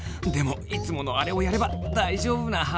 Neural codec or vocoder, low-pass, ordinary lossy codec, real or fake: none; none; none; real